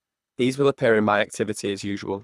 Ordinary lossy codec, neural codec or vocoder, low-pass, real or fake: none; codec, 24 kHz, 3 kbps, HILCodec; none; fake